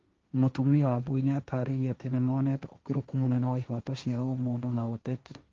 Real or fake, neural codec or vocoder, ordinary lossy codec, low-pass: fake; codec, 16 kHz, 1.1 kbps, Voila-Tokenizer; Opus, 32 kbps; 7.2 kHz